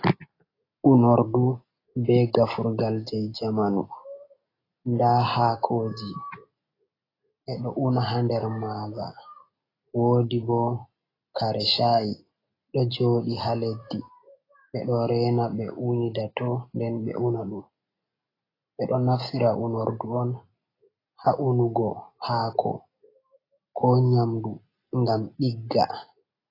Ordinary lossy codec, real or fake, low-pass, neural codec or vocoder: AAC, 24 kbps; real; 5.4 kHz; none